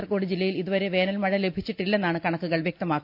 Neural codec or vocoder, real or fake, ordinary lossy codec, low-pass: none; real; AAC, 48 kbps; 5.4 kHz